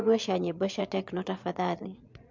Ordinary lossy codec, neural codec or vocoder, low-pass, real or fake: MP3, 64 kbps; vocoder, 22.05 kHz, 80 mel bands, Vocos; 7.2 kHz; fake